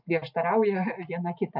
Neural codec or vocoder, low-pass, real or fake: none; 5.4 kHz; real